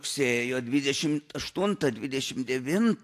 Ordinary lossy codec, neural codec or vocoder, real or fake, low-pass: AAC, 64 kbps; none; real; 14.4 kHz